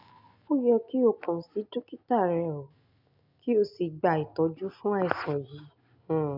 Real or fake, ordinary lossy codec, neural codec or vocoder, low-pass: real; none; none; 5.4 kHz